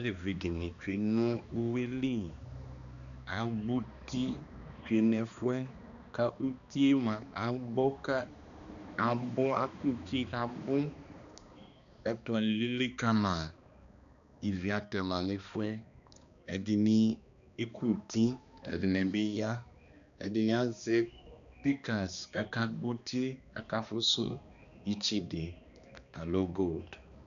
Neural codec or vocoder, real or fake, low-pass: codec, 16 kHz, 2 kbps, X-Codec, HuBERT features, trained on balanced general audio; fake; 7.2 kHz